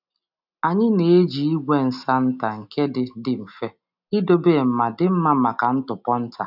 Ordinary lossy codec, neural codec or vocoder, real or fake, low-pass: none; none; real; 5.4 kHz